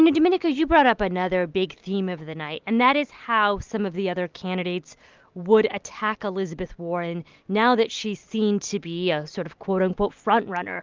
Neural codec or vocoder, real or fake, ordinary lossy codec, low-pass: none; real; Opus, 32 kbps; 7.2 kHz